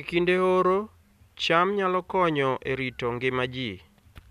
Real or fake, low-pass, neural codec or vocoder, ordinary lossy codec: real; 14.4 kHz; none; none